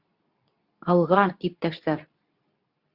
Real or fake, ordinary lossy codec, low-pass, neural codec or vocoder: fake; Opus, 64 kbps; 5.4 kHz; codec, 24 kHz, 0.9 kbps, WavTokenizer, medium speech release version 2